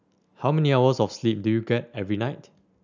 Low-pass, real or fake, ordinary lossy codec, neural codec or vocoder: 7.2 kHz; real; none; none